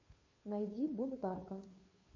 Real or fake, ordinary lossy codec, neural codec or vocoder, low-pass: fake; MP3, 32 kbps; codec, 16 kHz, 2 kbps, FunCodec, trained on Chinese and English, 25 frames a second; 7.2 kHz